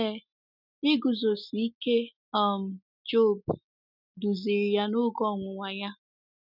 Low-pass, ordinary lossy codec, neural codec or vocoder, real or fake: 5.4 kHz; none; none; real